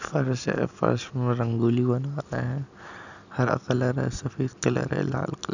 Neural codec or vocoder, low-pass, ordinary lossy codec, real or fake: none; 7.2 kHz; none; real